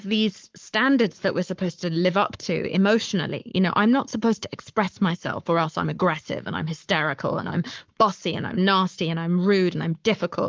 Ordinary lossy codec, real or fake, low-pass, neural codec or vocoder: Opus, 24 kbps; fake; 7.2 kHz; codec, 44.1 kHz, 7.8 kbps, Pupu-Codec